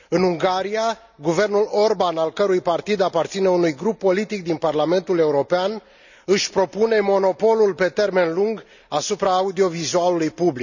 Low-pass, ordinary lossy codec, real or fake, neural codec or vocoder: 7.2 kHz; none; real; none